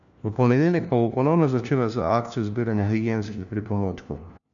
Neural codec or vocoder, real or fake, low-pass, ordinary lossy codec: codec, 16 kHz, 1 kbps, FunCodec, trained on LibriTTS, 50 frames a second; fake; 7.2 kHz; none